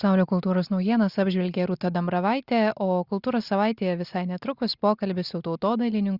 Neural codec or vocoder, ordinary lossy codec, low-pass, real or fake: none; Opus, 64 kbps; 5.4 kHz; real